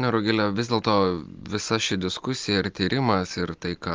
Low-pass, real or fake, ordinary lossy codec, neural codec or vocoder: 7.2 kHz; real; Opus, 24 kbps; none